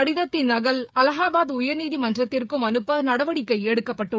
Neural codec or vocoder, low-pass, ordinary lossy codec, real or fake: codec, 16 kHz, 8 kbps, FreqCodec, smaller model; none; none; fake